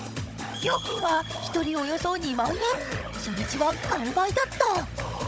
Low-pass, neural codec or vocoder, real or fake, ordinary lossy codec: none; codec, 16 kHz, 16 kbps, FunCodec, trained on Chinese and English, 50 frames a second; fake; none